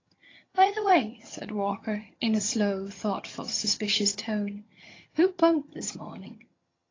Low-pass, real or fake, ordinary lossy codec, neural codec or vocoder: 7.2 kHz; fake; AAC, 32 kbps; vocoder, 22.05 kHz, 80 mel bands, HiFi-GAN